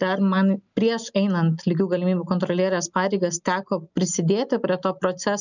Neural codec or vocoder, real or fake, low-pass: none; real; 7.2 kHz